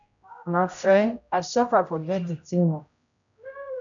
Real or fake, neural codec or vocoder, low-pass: fake; codec, 16 kHz, 0.5 kbps, X-Codec, HuBERT features, trained on general audio; 7.2 kHz